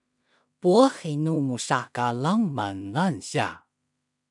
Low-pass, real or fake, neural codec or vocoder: 10.8 kHz; fake; codec, 16 kHz in and 24 kHz out, 0.4 kbps, LongCat-Audio-Codec, two codebook decoder